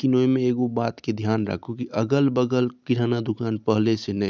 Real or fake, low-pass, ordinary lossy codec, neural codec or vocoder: real; none; none; none